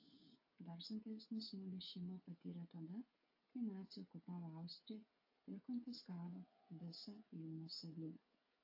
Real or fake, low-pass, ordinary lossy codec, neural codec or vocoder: fake; 5.4 kHz; AAC, 32 kbps; codec, 16 kHz, 8 kbps, FreqCodec, smaller model